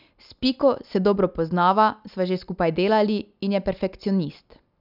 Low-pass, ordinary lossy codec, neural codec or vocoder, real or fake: 5.4 kHz; none; none; real